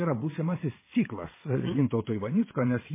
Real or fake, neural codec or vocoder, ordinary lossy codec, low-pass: fake; vocoder, 22.05 kHz, 80 mel bands, Vocos; MP3, 16 kbps; 3.6 kHz